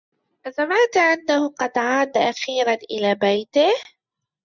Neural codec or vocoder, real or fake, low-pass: none; real; 7.2 kHz